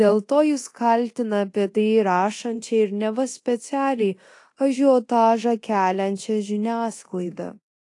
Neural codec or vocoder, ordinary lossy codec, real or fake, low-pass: codec, 24 kHz, 0.9 kbps, DualCodec; AAC, 48 kbps; fake; 10.8 kHz